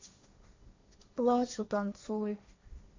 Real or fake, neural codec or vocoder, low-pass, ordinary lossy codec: fake; codec, 16 kHz, 1.1 kbps, Voila-Tokenizer; 7.2 kHz; AAC, 48 kbps